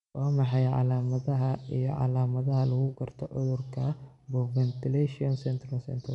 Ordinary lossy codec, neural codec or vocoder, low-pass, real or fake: none; none; 10.8 kHz; real